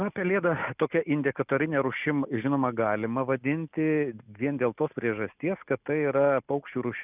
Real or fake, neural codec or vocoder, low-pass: real; none; 3.6 kHz